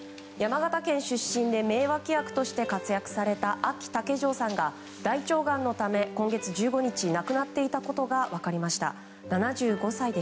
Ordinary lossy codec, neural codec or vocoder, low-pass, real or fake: none; none; none; real